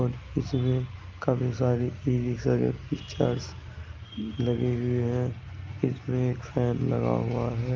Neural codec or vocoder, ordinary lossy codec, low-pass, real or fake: none; Opus, 24 kbps; 7.2 kHz; real